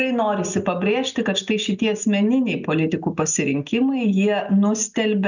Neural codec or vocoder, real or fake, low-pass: none; real; 7.2 kHz